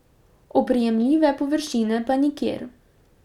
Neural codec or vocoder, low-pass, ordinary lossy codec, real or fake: none; 19.8 kHz; none; real